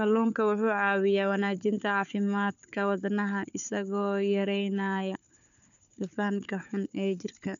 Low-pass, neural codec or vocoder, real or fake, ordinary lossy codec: 7.2 kHz; codec, 16 kHz, 4 kbps, FunCodec, trained on Chinese and English, 50 frames a second; fake; none